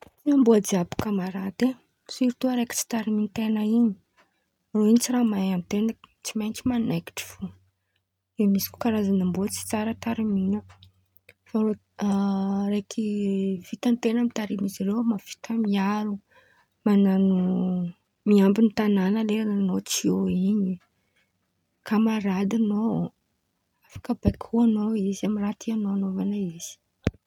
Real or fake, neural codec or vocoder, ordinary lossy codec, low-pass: real; none; none; 19.8 kHz